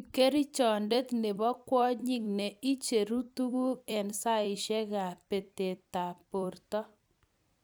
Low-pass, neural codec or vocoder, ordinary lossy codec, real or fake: none; vocoder, 44.1 kHz, 128 mel bands every 256 samples, BigVGAN v2; none; fake